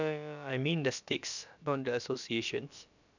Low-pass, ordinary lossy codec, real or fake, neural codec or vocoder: 7.2 kHz; none; fake; codec, 16 kHz, about 1 kbps, DyCAST, with the encoder's durations